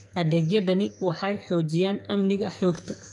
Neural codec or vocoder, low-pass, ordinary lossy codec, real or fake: codec, 44.1 kHz, 2.6 kbps, SNAC; 10.8 kHz; none; fake